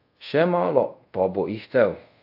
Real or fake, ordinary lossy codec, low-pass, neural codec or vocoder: fake; AAC, 48 kbps; 5.4 kHz; codec, 24 kHz, 0.5 kbps, DualCodec